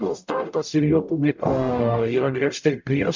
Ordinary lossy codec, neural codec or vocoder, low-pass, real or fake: MP3, 64 kbps; codec, 44.1 kHz, 0.9 kbps, DAC; 7.2 kHz; fake